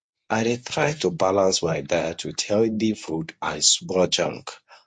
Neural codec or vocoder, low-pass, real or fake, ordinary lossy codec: codec, 24 kHz, 0.9 kbps, WavTokenizer, medium speech release version 1; 9.9 kHz; fake; MP3, 48 kbps